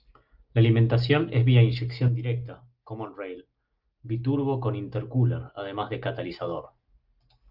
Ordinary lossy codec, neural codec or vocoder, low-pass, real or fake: Opus, 24 kbps; none; 5.4 kHz; real